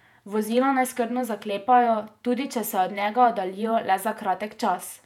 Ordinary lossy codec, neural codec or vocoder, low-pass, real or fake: none; vocoder, 48 kHz, 128 mel bands, Vocos; 19.8 kHz; fake